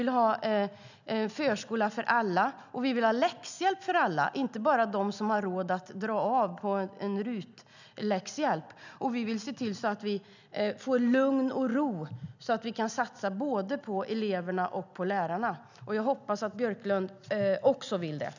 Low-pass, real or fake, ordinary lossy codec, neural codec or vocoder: 7.2 kHz; real; none; none